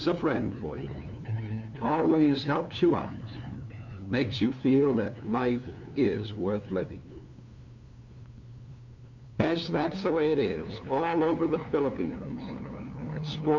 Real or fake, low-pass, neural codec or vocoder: fake; 7.2 kHz; codec, 16 kHz, 2 kbps, FunCodec, trained on LibriTTS, 25 frames a second